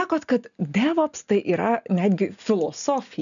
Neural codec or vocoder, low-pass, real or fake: none; 7.2 kHz; real